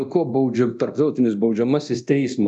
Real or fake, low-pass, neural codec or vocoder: fake; 10.8 kHz; codec, 24 kHz, 0.9 kbps, DualCodec